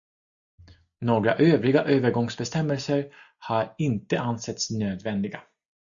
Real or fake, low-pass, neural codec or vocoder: real; 7.2 kHz; none